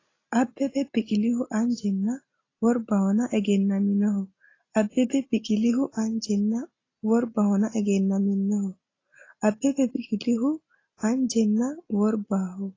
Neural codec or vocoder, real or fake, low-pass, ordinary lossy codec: none; real; 7.2 kHz; AAC, 32 kbps